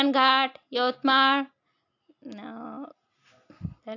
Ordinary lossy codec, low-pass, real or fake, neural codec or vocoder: AAC, 48 kbps; 7.2 kHz; real; none